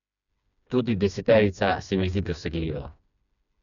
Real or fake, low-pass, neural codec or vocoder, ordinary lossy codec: fake; 7.2 kHz; codec, 16 kHz, 2 kbps, FreqCodec, smaller model; none